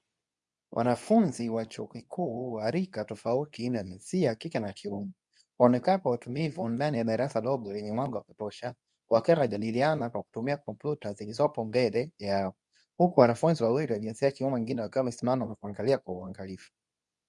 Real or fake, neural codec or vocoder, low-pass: fake; codec, 24 kHz, 0.9 kbps, WavTokenizer, medium speech release version 2; 10.8 kHz